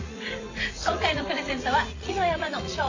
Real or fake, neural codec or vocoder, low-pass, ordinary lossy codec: fake; vocoder, 44.1 kHz, 80 mel bands, Vocos; 7.2 kHz; AAC, 32 kbps